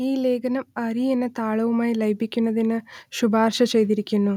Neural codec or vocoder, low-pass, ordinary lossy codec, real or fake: none; 19.8 kHz; none; real